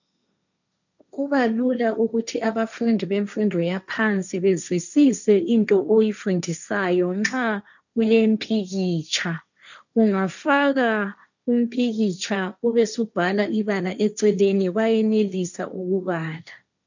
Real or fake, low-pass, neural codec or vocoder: fake; 7.2 kHz; codec, 16 kHz, 1.1 kbps, Voila-Tokenizer